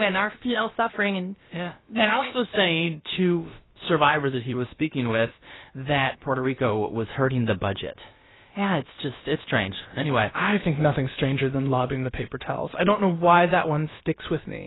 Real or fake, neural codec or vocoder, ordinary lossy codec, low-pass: fake; codec, 16 kHz, about 1 kbps, DyCAST, with the encoder's durations; AAC, 16 kbps; 7.2 kHz